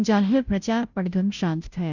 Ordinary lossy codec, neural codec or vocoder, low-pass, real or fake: none; codec, 16 kHz, 0.5 kbps, FunCodec, trained on Chinese and English, 25 frames a second; 7.2 kHz; fake